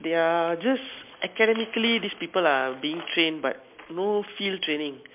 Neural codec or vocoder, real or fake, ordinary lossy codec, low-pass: none; real; MP3, 32 kbps; 3.6 kHz